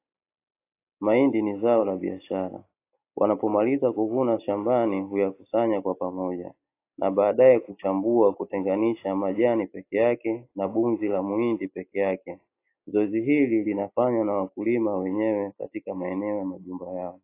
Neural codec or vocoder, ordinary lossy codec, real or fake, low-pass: none; AAC, 24 kbps; real; 3.6 kHz